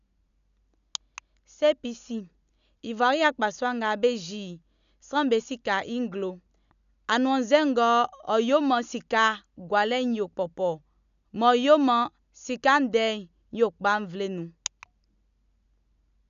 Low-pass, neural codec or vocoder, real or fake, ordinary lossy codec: 7.2 kHz; none; real; none